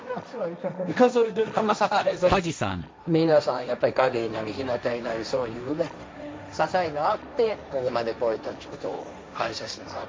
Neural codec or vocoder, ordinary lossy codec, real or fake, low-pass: codec, 16 kHz, 1.1 kbps, Voila-Tokenizer; none; fake; none